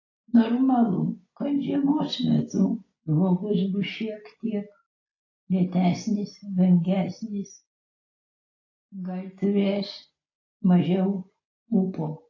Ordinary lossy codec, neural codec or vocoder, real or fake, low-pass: AAC, 32 kbps; none; real; 7.2 kHz